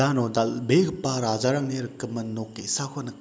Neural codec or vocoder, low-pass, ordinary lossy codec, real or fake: none; none; none; real